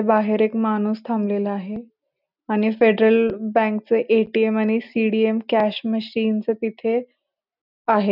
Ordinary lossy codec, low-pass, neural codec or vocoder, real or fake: none; 5.4 kHz; none; real